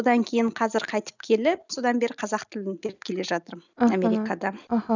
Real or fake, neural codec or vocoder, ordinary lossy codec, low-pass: real; none; none; 7.2 kHz